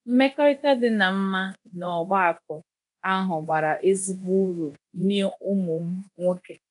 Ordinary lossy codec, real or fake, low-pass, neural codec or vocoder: none; fake; 10.8 kHz; codec, 24 kHz, 0.9 kbps, DualCodec